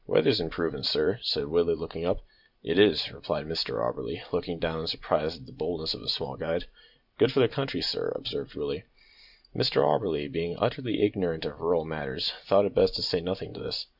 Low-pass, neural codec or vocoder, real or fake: 5.4 kHz; vocoder, 44.1 kHz, 128 mel bands every 512 samples, BigVGAN v2; fake